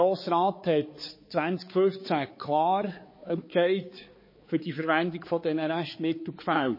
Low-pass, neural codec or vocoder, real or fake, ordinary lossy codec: 5.4 kHz; codec, 16 kHz, 4 kbps, X-Codec, HuBERT features, trained on balanced general audio; fake; MP3, 24 kbps